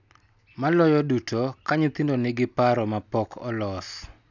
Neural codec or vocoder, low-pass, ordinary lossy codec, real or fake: none; 7.2 kHz; none; real